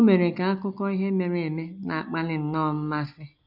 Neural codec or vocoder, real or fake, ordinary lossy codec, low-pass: none; real; none; 5.4 kHz